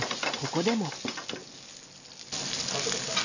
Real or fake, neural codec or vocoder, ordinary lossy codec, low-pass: real; none; none; 7.2 kHz